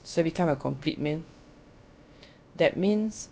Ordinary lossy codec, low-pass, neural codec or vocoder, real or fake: none; none; codec, 16 kHz, about 1 kbps, DyCAST, with the encoder's durations; fake